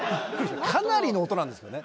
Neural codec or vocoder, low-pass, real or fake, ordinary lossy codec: none; none; real; none